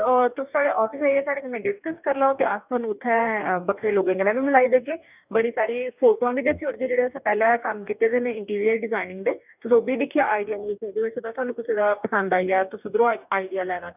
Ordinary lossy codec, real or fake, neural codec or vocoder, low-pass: none; fake; codec, 44.1 kHz, 2.6 kbps, DAC; 3.6 kHz